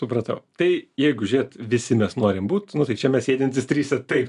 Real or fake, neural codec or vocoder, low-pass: real; none; 10.8 kHz